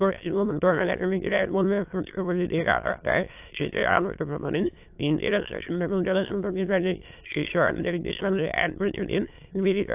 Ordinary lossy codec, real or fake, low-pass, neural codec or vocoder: AAC, 32 kbps; fake; 3.6 kHz; autoencoder, 22.05 kHz, a latent of 192 numbers a frame, VITS, trained on many speakers